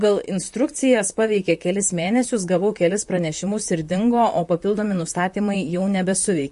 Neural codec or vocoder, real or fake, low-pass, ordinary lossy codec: vocoder, 44.1 kHz, 128 mel bands, Pupu-Vocoder; fake; 14.4 kHz; MP3, 48 kbps